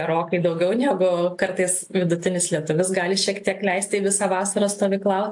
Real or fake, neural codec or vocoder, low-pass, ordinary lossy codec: real; none; 10.8 kHz; AAC, 64 kbps